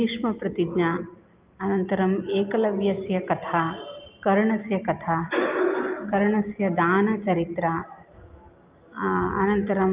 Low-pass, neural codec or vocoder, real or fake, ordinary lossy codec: 3.6 kHz; none; real; Opus, 32 kbps